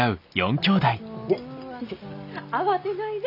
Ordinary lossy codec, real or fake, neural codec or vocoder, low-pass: none; real; none; 5.4 kHz